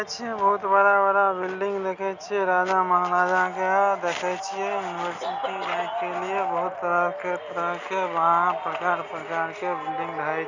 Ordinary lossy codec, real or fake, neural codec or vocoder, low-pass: Opus, 64 kbps; real; none; 7.2 kHz